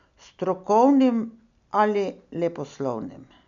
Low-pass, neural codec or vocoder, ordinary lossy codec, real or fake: 7.2 kHz; none; none; real